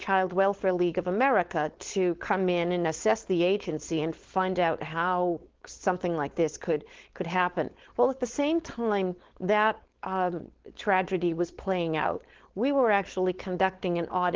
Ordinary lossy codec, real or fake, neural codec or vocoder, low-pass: Opus, 16 kbps; fake; codec, 16 kHz, 4.8 kbps, FACodec; 7.2 kHz